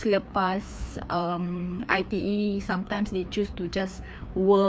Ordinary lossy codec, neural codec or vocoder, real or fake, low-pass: none; codec, 16 kHz, 2 kbps, FreqCodec, larger model; fake; none